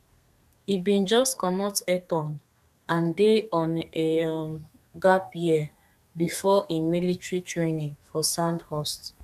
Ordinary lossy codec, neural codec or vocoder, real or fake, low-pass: none; codec, 32 kHz, 1.9 kbps, SNAC; fake; 14.4 kHz